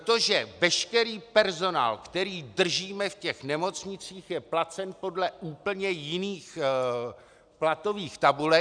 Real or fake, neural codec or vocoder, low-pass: real; none; 9.9 kHz